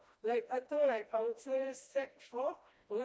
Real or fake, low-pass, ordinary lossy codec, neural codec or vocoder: fake; none; none; codec, 16 kHz, 1 kbps, FreqCodec, smaller model